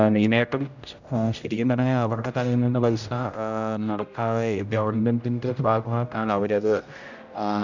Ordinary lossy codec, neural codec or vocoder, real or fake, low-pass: none; codec, 16 kHz, 0.5 kbps, X-Codec, HuBERT features, trained on general audio; fake; 7.2 kHz